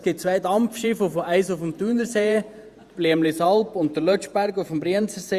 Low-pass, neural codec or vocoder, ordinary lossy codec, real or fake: 14.4 kHz; vocoder, 48 kHz, 128 mel bands, Vocos; Opus, 64 kbps; fake